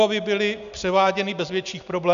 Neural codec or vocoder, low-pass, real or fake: none; 7.2 kHz; real